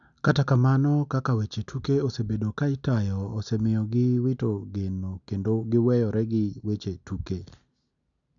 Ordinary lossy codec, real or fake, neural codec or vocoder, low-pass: none; real; none; 7.2 kHz